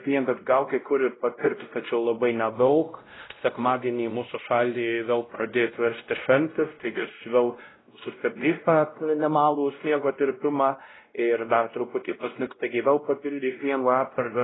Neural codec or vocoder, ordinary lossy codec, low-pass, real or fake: codec, 16 kHz, 0.5 kbps, X-Codec, WavLM features, trained on Multilingual LibriSpeech; AAC, 16 kbps; 7.2 kHz; fake